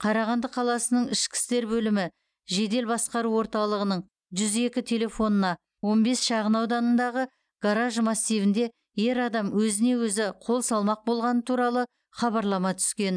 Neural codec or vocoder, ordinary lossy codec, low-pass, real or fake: none; none; 9.9 kHz; real